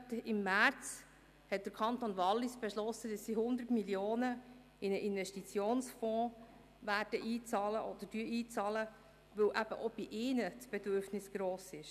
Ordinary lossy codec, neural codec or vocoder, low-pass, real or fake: none; none; 14.4 kHz; real